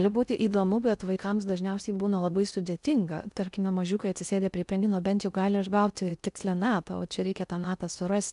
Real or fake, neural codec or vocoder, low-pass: fake; codec, 16 kHz in and 24 kHz out, 0.6 kbps, FocalCodec, streaming, 2048 codes; 10.8 kHz